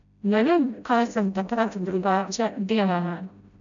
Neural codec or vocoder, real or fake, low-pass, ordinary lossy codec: codec, 16 kHz, 0.5 kbps, FreqCodec, smaller model; fake; 7.2 kHz; none